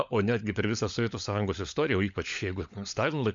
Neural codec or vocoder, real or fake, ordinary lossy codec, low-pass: codec, 16 kHz, 16 kbps, FunCodec, trained on LibriTTS, 50 frames a second; fake; MP3, 64 kbps; 7.2 kHz